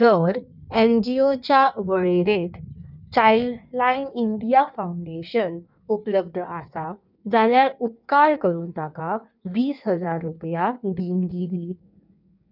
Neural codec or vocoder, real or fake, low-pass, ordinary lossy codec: codec, 16 kHz in and 24 kHz out, 1.1 kbps, FireRedTTS-2 codec; fake; 5.4 kHz; none